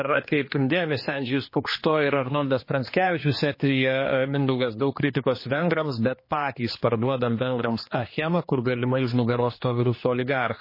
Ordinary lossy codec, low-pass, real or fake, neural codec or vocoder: MP3, 24 kbps; 5.4 kHz; fake; codec, 16 kHz, 2 kbps, X-Codec, HuBERT features, trained on general audio